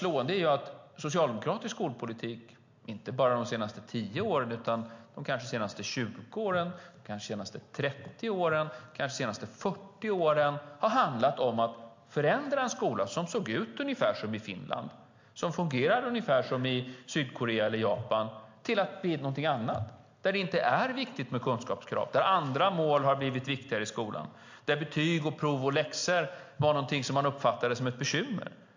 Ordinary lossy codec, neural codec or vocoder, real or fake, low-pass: MP3, 48 kbps; none; real; 7.2 kHz